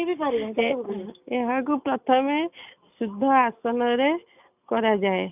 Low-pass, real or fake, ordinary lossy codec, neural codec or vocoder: 3.6 kHz; real; none; none